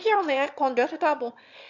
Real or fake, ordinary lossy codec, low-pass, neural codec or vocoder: fake; none; 7.2 kHz; autoencoder, 22.05 kHz, a latent of 192 numbers a frame, VITS, trained on one speaker